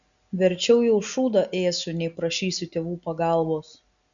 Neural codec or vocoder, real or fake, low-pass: none; real; 7.2 kHz